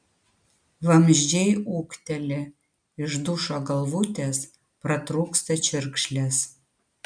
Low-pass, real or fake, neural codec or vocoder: 9.9 kHz; real; none